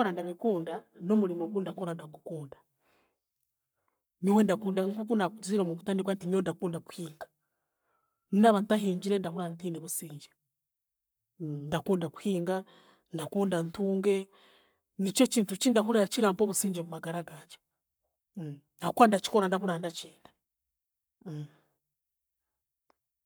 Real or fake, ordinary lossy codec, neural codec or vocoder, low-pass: fake; none; codec, 44.1 kHz, 7.8 kbps, Pupu-Codec; none